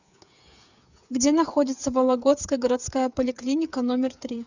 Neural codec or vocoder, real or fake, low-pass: codec, 16 kHz, 8 kbps, FreqCodec, smaller model; fake; 7.2 kHz